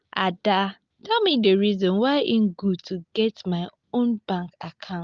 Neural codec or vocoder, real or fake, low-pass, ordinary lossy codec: none; real; 7.2 kHz; Opus, 32 kbps